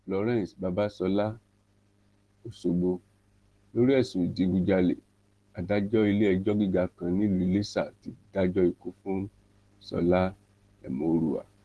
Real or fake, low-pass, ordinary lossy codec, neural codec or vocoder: real; 10.8 kHz; Opus, 16 kbps; none